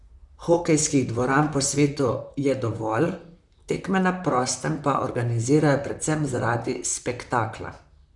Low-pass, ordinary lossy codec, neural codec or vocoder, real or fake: 10.8 kHz; none; vocoder, 44.1 kHz, 128 mel bands, Pupu-Vocoder; fake